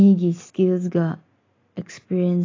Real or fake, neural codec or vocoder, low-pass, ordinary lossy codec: real; none; 7.2 kHz; AAC, 32 kbps